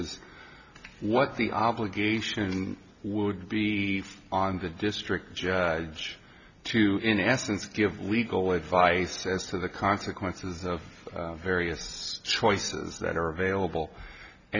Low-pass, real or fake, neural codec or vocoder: 7.2 kHz; real; none